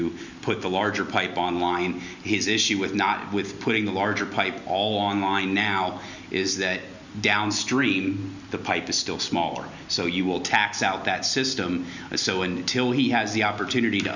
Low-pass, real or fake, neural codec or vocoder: 7.2 kHz; real; none